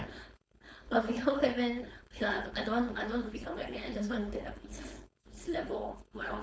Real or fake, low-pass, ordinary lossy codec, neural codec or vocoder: fake; none; none; codec, 16 kHz, 4.8 kbps, FACodec